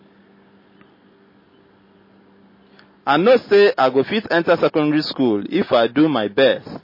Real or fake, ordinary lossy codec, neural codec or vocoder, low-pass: real; MP3, 24 kbps; none; 5.4 kHz